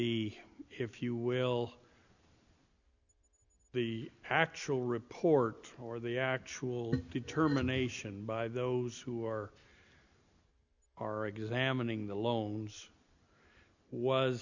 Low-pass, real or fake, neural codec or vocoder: 7.2 kHz; real; none